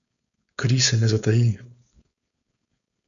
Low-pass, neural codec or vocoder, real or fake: 7.2 kHz; codec, 16 kHz, 4.8 kbps, FACodec; fake